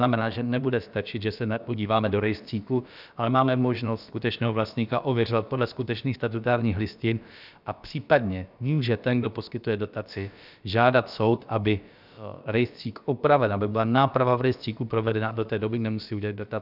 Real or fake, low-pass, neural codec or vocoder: fake; 5.4 kHz; codec, 16 kHz, about 1 kbps, DyCAST, with the encoder's durations